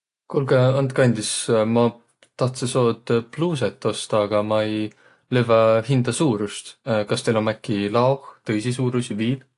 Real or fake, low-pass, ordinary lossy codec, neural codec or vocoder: real; 10.8 kHz; AAC, 48 kbps; none